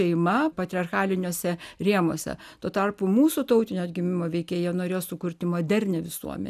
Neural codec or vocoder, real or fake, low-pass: none; real; 14.4 kHz